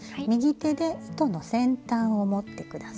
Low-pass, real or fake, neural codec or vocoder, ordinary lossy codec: none; real; none; none